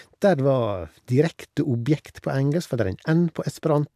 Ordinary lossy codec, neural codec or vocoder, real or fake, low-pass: AAC, 96 kbps; none; real; 14.4 kHz